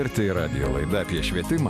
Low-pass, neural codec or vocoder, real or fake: 14.4 kHz; none; real